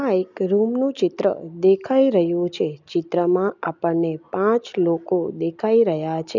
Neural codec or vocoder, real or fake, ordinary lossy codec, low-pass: none; real; none; 7.2 kHz